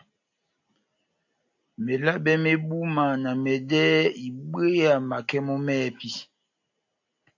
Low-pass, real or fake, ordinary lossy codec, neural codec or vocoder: 7.2 kHz; real; AAC, 48 kbps; none